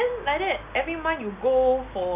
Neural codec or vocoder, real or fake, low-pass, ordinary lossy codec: none; real; 3.6 kHz; none